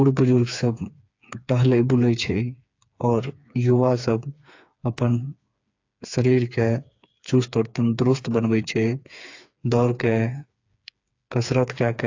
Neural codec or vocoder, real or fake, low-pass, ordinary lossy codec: codec, 16 kHz, 4 kbps, FreqCodec, smaller model; fake; 7.2 kHz; AAC, 48 kbps